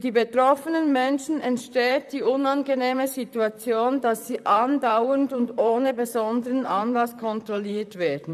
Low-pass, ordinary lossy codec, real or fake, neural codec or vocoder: 14.4 kHz; none; fake; vocoder, 44.1 kHz, 128 mel bands, Pupu-Vocoder